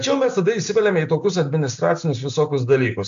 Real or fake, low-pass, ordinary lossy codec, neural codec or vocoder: real; 7.2 kHz; AAC, 64 kbps; none